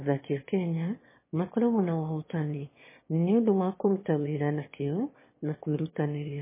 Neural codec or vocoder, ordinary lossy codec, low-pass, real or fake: autoencoder, 22.05 kHz, a latent of 192 numbers a frame, VITS, trained on one speaker; MP3, 16 kbps; 3.6 kHz; fake